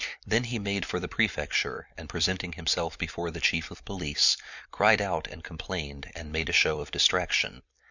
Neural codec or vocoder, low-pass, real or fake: vocoder, 44.1 kHz, 128 mel bands every 256 samples, BigVGAN v2; 7.2 kHz; fake